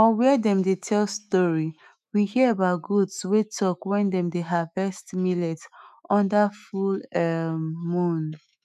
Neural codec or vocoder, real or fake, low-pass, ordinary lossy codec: autoencoder, 48 kHz, 128 numbers a frame, DAC-VAE, trained on Japanese speech; fake; 14.4 kHz; none